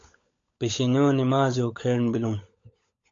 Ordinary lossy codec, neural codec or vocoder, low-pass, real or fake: AAC, 48 kbps; codec, 16 kHz, 16 kbps, FunCodec, trained on LibriTTS, 50 frames a second; 7.2 kHz; fake